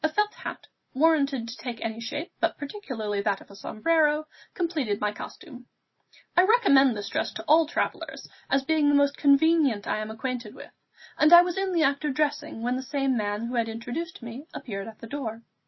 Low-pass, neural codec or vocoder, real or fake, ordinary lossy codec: 7.2 kHz; none; real; MP3, 24 kbps